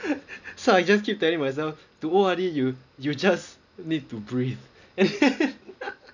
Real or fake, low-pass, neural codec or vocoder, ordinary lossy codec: real; 7.2 kHz; none; none